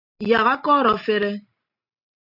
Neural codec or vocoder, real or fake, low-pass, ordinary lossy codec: none; real; 5.4 kHz; AAC, 48 kbps